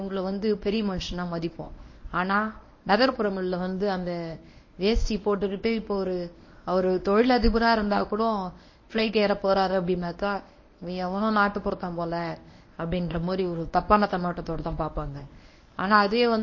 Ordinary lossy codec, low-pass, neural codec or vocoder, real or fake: MP3, 32 kbps; 7.2 kHz; codec, 24 kHz, 0.9 kbps, WavTokenizer, medium speech release version 1; fake